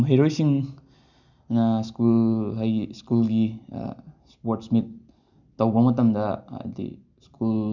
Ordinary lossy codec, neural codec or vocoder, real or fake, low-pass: none; none; real; 7.2 kHz